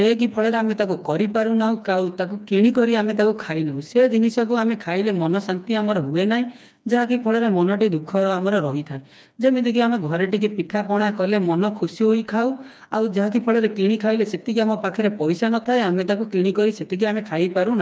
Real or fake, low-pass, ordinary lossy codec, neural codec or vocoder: fake; none; none; codec, 16 kHz, 2 kbps, FreqCodec, smaller model